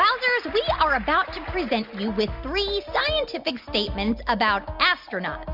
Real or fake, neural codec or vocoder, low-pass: real; none; 5.4 kHz